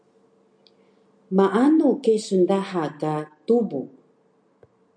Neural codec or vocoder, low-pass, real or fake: none; 9.9 kHz; real